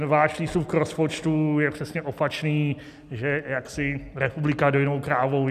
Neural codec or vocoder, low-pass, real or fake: none; 14.4 kHz; real